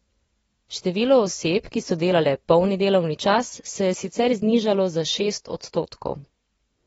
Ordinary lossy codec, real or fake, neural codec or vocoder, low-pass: AAC, 24 kbps; fake; vocoder, 24 kHz, 100 mel bands, Vocos; 10.8 kHz